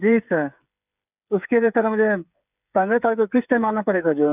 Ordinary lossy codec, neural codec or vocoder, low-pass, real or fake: none; codec, 16 kHz, 8 kbps, FreqCodec, smaller model; 3.6 kHz; fake